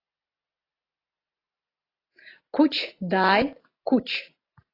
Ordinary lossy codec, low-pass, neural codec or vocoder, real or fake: AAC, 24 kbps; 5.4 kHz; none; real